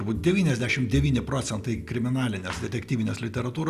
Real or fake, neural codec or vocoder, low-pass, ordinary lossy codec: real; none; 14.4 kHz; Opus, 64 kbps